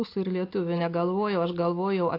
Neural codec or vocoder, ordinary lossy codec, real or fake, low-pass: none; AAC, 32 kbps; real; 5.4 kHz